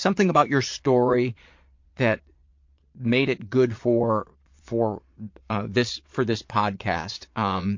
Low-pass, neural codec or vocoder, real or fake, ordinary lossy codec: 7.2 kHz; vocoder, 22.05 kHz, 80 mel bands, Vocos; fake; MP3, 48 kbps